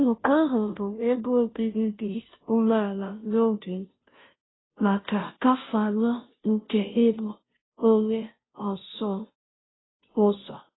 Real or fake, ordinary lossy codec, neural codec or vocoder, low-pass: fake; AAC, 16 kbps; codec, 16 kHz, 0.5 kbps, FunCodec, trained on Chinese and English, 25 frames a second; 7.2 kHz